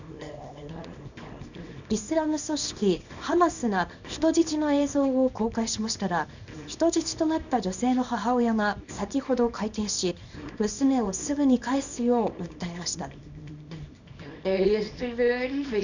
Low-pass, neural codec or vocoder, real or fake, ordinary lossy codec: 7.2 kHz; codec, 24 kHz, 0.9 kbps, WavTokenizer, small release; fake; none